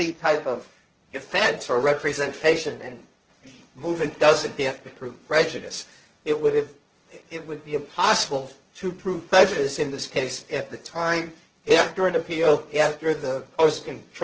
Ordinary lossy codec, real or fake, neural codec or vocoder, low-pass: Opus, 16 kbps; fake; codec, 16 kHz, 1.1 kbps, Voila-Tokenizer; 7.2 kHz